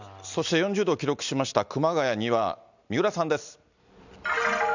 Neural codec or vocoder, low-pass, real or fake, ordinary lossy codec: none; 7.2 kHz; real; none